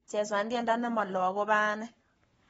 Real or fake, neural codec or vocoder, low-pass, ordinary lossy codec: fake; codec, 44.1 kHz, 7.8 kbps, Pupu-Codec; 19.8 kHz; AAC, 24 kbps